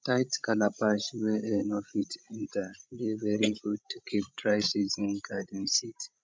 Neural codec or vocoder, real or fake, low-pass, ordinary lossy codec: codec, 16 kHz, 16 kbps, FreqCodec, larger model; fake; none; none